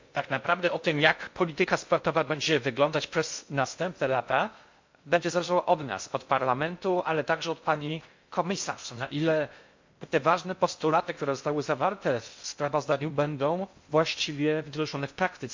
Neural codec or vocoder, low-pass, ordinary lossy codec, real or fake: codec, 16 kHz in and 24 kHz out, 0.6 kbps, FocalCodec, streaming, 2048 codes; 7.2 kHz; MP3, 48 kbps; fake